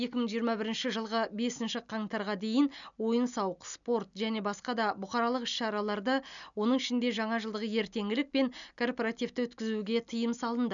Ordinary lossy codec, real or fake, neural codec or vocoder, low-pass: none; real; none; 7.2 kHz